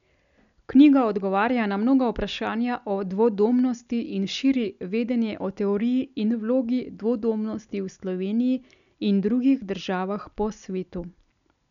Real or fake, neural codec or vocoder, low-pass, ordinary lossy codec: real; none; 7.2 kHz; none